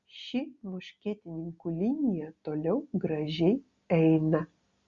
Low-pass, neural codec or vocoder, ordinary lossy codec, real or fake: 7.2 kHz; none; Opus, 64 kbps; real